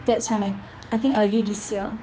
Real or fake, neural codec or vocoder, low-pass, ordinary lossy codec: fake; codec, 16 kHz, 2 kbps, X-Codec, HuBERT features, trained on general audio; none; none